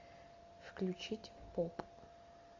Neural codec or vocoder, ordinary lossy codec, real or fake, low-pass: none; MP3, 48 kbps; real; 7.2 kHz